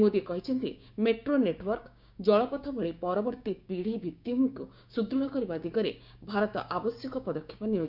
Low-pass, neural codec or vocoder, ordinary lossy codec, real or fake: 5.4 kHz; codec, 16 kHz, 6 kbps, DAC; none; fake